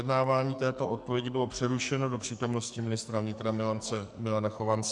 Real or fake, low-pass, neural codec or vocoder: fake; 10.8 kHz; codec, 44.1 kHz, 2.6 kbps, SNAC